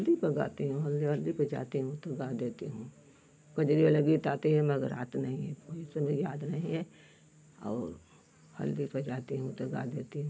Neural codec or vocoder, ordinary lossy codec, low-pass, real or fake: none; none; none; real